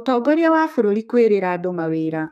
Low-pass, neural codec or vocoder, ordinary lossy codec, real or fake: 14.4 kHz; codec, 32 kHz, 1.9 kbps, SNAC; none; fake